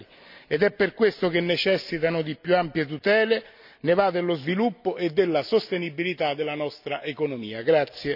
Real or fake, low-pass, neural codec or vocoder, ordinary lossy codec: real; 5.4 kHz; none; none